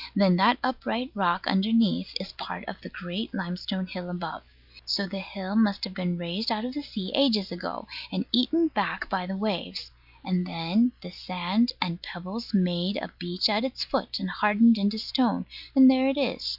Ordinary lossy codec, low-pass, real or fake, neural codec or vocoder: Opus, 64 kbps; 5.4 kHz; real; none